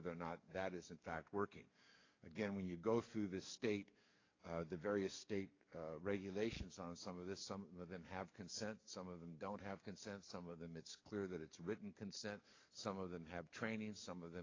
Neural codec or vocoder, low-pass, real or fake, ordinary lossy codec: codec, 44.1 kHz, 7.8 kbps, DAC; 7.2 kHz; fake; AAC, 32 kbps